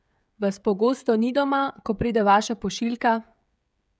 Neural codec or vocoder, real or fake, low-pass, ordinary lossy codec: codec, 16 kHz, 16 kbps, FreqCodec, smaller model; fake; none; none